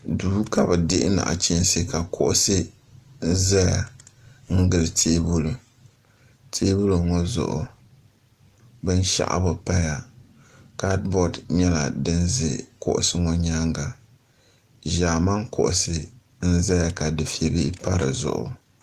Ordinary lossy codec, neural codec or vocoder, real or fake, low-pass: Opus, 24 kbps; none; real; 14.4 kHz